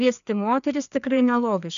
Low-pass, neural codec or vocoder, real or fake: 7.2 kHz; codec, 16 kHz, 2 kbps, FreqCodec, larger model; fake